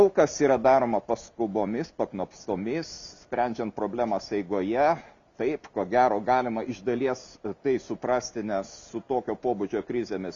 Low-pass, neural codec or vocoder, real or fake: 7.2 kHz; none; real